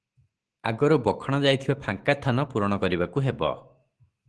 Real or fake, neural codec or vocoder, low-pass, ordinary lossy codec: fake; autoencoder, 48 kHz, 128 numbers a frame, DAC-VAE, trained on Japanese speech; 10.8 kHz; Opus, 16 kbps